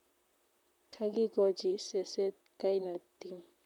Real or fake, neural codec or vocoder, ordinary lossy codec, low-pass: fake; vocoder, 44.1 kHz, 128 mel bands, Pupu-Vocoder; none; 19.8 kHz